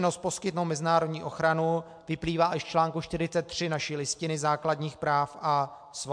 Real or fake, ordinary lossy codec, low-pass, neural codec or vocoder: real; MP3, 64 kbps; 9.9 kHz; none